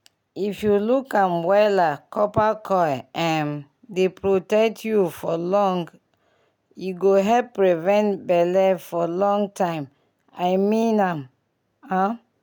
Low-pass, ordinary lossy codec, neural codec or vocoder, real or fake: none; none; none; real